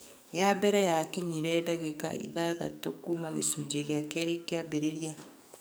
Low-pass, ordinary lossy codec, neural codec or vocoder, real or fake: none; none; codec, 44.1 kHz, 2.6 kbps, SNAC; fake